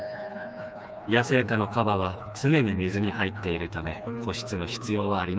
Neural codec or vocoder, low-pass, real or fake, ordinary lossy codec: codec, 16 kHz, 2 kbps, FreqCodec, smaller model; none; fake; none